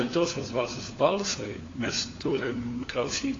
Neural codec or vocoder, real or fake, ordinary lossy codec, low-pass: codec, 16 kHz, 4 kbps, FunCodec, trained on LibriTTS, 50 frames a second; fake; AAC, 32 kbps; 7.2 kHz